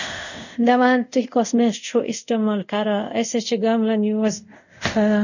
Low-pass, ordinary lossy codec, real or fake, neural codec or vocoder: 7.2 kHz; none; fake; codec, 24 kHz, 0.5 kbps, DualCodec